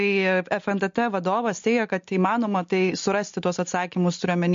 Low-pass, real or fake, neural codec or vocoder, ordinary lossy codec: 7.2 kHz; real; none; MP3, 48 kbps